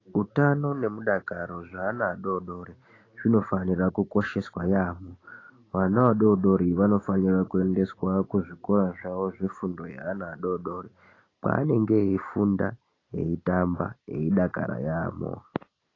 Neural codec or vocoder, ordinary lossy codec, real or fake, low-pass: none; AAC, 32 kbps; real; 7.2 kHz